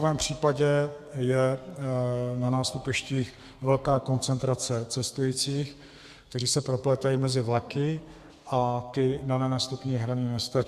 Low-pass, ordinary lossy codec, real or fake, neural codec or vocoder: 14.4 kHz; Opus, 64 kbps; fake; codec, 32 kHz, 1.9 kbps, SNAC